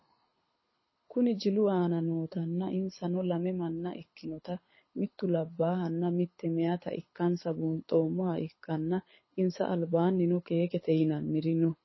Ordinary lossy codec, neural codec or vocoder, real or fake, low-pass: MP3, 24 kbps; codec, 24 kHz, 6 kbps, HILCodec; fake; 7.2 kHz